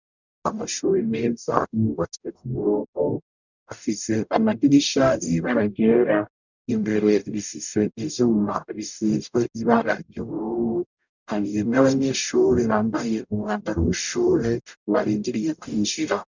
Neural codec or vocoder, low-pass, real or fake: codec, 44.1 kHz, 0.9 kbps, DAC; 7.2 kHz; fake